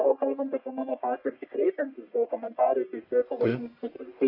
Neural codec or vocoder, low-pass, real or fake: codec, 44.1 kHz, 1.7 kbps, Pupu-Codec; 5.4 kHz; fake